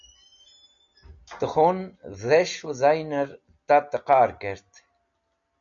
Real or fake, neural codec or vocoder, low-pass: real; none; 7.2 kHz